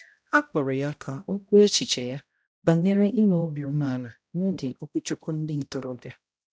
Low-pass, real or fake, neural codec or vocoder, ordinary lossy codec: none; fake; codec, 16 kHz, 0.5 kbps, X-Codec, HuBERT features, trained on balanced general audio; none